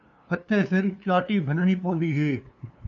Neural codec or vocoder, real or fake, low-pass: codec, 16 kHz, 2 kbps, FunCodec, trained on LibriTTS, 25 frames a second; fake; 7.2 kHz